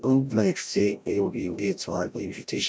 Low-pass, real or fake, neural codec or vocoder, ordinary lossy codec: none; fake; codec, 16 kHz, 0.5 kbps, FreqCodec, larger model; none